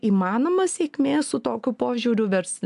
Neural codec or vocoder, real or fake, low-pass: none; real; 10.8 kHz